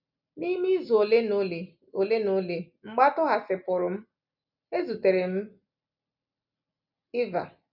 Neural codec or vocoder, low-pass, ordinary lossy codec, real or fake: none; 5.4 kHz; none; real